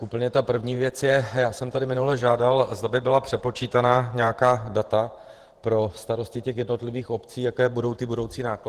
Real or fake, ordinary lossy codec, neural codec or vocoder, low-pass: fake; Opus, 16 kbps; vocoder, 48 kHz, 128 mel bands, Vocos; 14.4 kHz